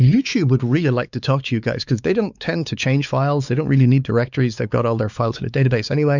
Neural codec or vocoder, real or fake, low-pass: codec, 16 kHz, 4 kbps, X-Codec, WavLM features, trained on Multilingual LibriSpeech; fake; 7.2 kHz